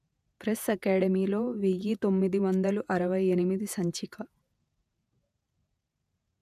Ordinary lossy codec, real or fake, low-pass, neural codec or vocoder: none; fake; 14.4 kHz; vocoder, 48 kHz, 128 mel bands, Vocos